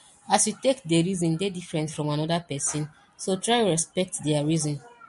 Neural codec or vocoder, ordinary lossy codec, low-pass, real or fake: none; MP3, 48 kbps; 14.4 kHz; real